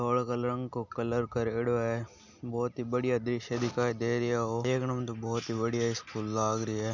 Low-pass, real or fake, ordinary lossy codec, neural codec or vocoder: 7.2 kHz; real; none; none